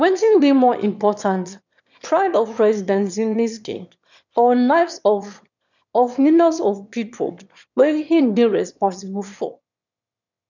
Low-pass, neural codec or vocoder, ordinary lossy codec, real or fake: 7.2 kHz; autoencoder, 22.05 kHz, a latent of 192 numbers a frame, VITS, trained on one speaker; none; fake